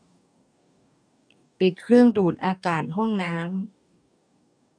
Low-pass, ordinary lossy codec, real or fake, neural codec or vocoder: 9.9 kHz; none; fake; codec, 44.1 kHz, 2.6 kbps, DAC